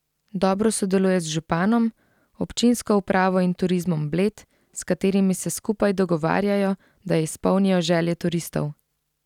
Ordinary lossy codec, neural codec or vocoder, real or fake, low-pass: none; none; real; 19.8 kHz